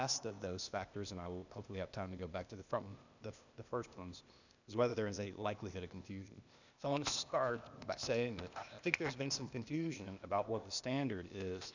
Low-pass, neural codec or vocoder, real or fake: 7.2 kHz; codec, 16 kHz, 0.8 kbps, ZipCodec; fake